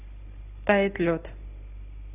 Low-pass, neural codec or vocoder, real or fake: 3.6 kHz; none; real